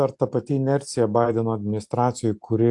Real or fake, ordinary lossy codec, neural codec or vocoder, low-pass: real; AAC, 64 kbps; none; 10.8 kHz